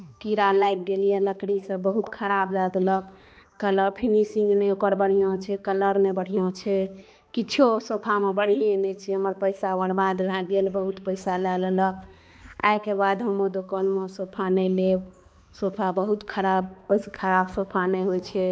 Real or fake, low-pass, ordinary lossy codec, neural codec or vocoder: fake; none; none; codec, 16 kHz, 2 kbps, X-Codec, HuBERT features, trained on balanced general audio